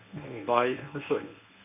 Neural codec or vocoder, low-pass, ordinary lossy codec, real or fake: codec, 24 kHz, 0.9 kbps, WavTokenizer, medium speech release version 1; 3.6 kHz; none; fake